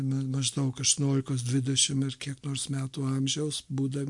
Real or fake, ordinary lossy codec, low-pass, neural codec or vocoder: real; MP3, 64 kbps; 10.8 kHz; none